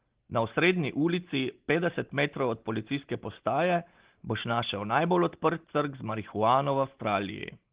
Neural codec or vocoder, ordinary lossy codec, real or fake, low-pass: none; Opus, 16 kbps; real; 3.6 kHz